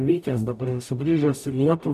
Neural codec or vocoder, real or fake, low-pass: codec, 44.1 kHz, 0.9 kbps, DAC; fake; 14.4 kHz